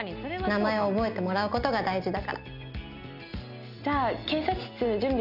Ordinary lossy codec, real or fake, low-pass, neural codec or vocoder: none; real; 5.4 kHz; none